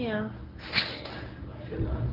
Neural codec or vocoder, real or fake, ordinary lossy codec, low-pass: codec, 24 kHz, 0.9 kbps, WavTokenizer, medium speech release version 1; fake; Opus, 24 kbps; 5.4 kHz